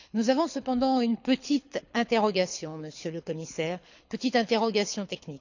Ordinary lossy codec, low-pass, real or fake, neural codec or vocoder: none; 7.2 kHz; fake; codec, 24 kHz, 6 kbps, HILCodec